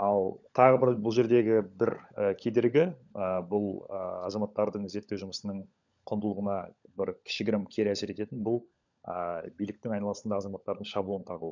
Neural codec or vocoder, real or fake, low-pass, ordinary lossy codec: codec, 16 kHz, 16 kbps, FunCodec, trained on LibriTTS, 50 frames a second; fake; 7.2 kHz; none